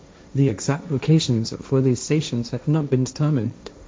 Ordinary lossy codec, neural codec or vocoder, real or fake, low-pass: none; codec, 16 kHz, 1.1 kbps, Voila-Tokenizer; fake; none